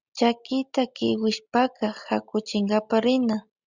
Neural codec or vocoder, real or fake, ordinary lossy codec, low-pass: none; real; Opus, 64 kbps; 7.2 kHz